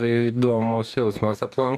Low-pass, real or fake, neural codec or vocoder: 14.4 kHz; fake; codec, 44.1 kHz, 2.6 kbps, DAC